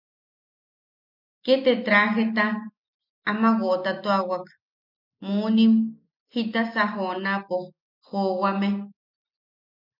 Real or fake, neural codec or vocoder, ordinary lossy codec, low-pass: real; none; MP3, 48 kbps; 5.4 kHz